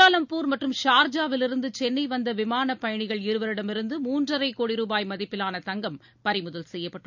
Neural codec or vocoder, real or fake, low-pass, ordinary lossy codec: none; real; 7.2 kHz; none